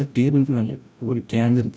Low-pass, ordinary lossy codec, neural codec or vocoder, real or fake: none; none; codec, 16 kHz, 0.5 kbps, FreqCodec, larger model; fake